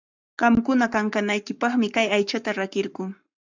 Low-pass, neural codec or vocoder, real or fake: 7.2 kHz; codec, 44.1 kHz, 7.8 kbps, Pupu-Codec; fake